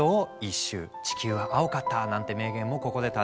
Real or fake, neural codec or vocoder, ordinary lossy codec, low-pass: real; none; none; none